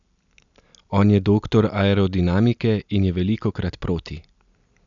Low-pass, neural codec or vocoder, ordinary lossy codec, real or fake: 7.2 kHz; none; none; real